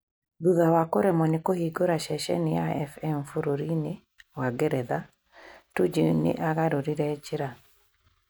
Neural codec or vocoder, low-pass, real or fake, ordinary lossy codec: none; none; real; none